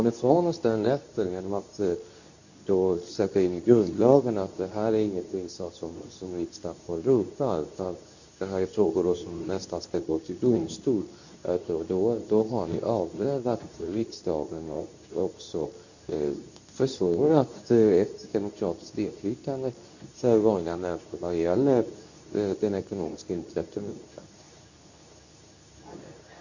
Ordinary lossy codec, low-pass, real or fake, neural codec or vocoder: none; 7.2 kHz; fake; codec, 24 kHz, 0.9 kbps, WavTokenizer, medium speech release version 2